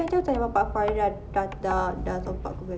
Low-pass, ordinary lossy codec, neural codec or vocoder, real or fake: none; none; none; real